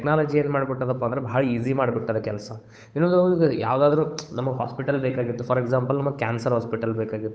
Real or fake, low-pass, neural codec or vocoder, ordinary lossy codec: fake; none; codec, 16 kHz, 8 kbps, FunCodec, trained on Chinese and English, 25 frames a second; none